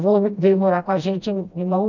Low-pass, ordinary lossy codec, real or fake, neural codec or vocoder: 7.2 kHz; none; fake; codec, 16 kHz, 1 kbps, FreqCodec, smaller model